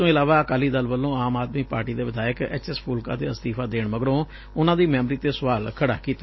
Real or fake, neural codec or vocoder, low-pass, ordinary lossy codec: real; none; 7.2 kHz; MP3, 24 kbps